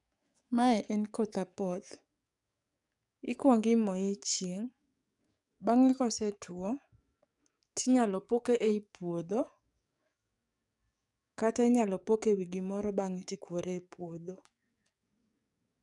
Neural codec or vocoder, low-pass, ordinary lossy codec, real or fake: codec, 44.1 kHz, 7.8 kbps, DAC; 10.8 kHz; none; fake